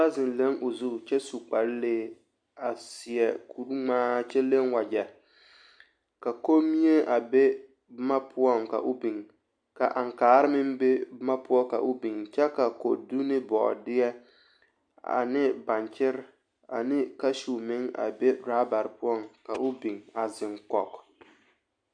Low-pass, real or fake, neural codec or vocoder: 9.9 kHz; real; none